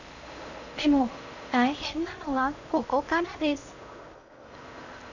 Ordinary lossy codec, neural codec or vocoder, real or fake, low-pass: none; codec, 16 kHz in and 24 kHz out, 0.8 kbps, FocalCodec, streaming, 65536 codes; fake; 7.2 kHz